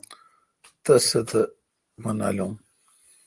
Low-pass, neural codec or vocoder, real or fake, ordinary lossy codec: 10.8 kHz; none; real; Opus, 24 kbps